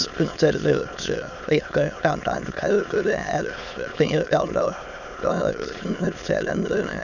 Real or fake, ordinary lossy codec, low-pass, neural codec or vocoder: fake; none; 7.2 kHz; autoencoder, 22.05 kHz, a latent of 192 numbers a frame, VITS, trained on many speakers